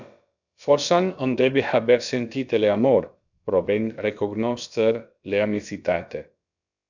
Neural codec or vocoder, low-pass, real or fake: codec, 16 kHz, about 1 kbps, DyCAST, with the encoder's durations; 7.2 kHz; fake